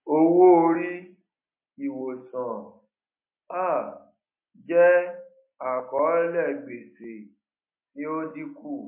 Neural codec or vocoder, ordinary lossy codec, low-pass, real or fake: none; MP3, 24 kbps; 3.6 kHz; real